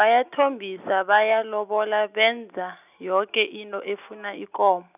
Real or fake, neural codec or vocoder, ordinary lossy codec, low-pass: real; none; none; 3.6 kHz